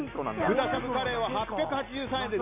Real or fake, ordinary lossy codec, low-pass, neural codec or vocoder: real; MP3, 32 kbps; 3.6 kHz; none